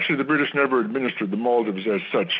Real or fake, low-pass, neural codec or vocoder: real; 7.2 kHz; none